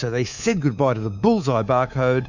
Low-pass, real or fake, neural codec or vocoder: 7.2 kHz; fake; codec, 24 kHz, 3.1 kbps, DualCodec